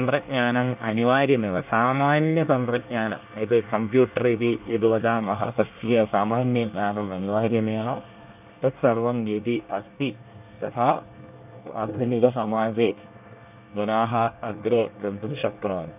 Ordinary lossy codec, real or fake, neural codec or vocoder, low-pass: none; fake; codec, 24 kHz, 1 kbps, SNAC; 3.6 kHz